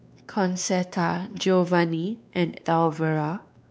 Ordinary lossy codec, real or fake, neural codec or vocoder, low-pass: none; fake; codec, 16 kHz, 2 kbps, X-Codec, WavLM features, trained on Multilingual LibriSpeech; none